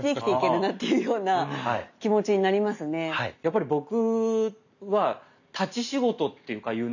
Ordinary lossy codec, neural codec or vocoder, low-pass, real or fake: none; none; 7.2 kHz; real